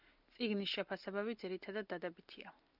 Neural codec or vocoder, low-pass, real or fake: none; 5.4 kHz; real